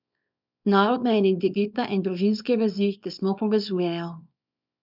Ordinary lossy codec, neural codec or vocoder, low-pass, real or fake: none; codec, 24 kHz, 0.9 kbps, WavTokenizer, small release; 5.4 kHz; fake